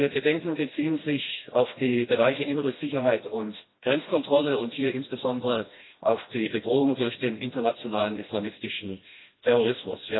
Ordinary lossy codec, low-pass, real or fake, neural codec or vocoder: AAC, 16 kbps; 7.2 kHz; fake; codec, 16 kHz, 1 kbps, FreqCodec, smaller model